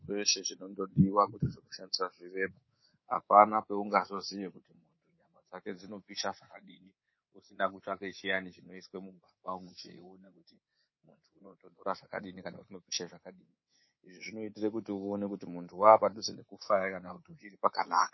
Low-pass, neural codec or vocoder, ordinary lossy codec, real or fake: 7.2 kHz; codec, 24 kHz, 3.1 kbps, DualCodec; MP3, 24 kbps; fake